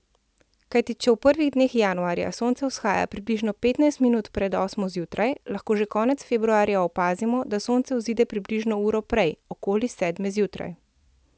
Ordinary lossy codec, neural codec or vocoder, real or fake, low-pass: none; none; real; none